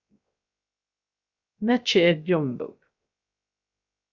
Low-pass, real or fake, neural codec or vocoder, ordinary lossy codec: 7.2 kHz; fake; codec, 16 kHz, 0.3 kbps, FocalCodec; Opus, 64 kbps